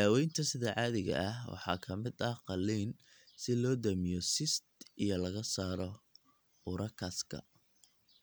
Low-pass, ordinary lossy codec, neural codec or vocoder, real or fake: none; none; none; real